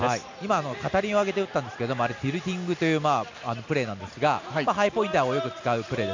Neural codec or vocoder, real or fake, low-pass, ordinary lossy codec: none; real; 7.2 kHz; MP3, 64 kbps